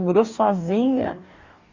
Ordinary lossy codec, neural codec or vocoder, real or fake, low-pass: Opus, 64 kbps; codec, 44.1 kHz, 2.6 kbps, DAC; fake; 7.2 kHz